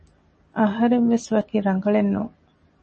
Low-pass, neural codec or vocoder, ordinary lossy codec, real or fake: 10.8 kHz; none; MP3, 32 kbps; real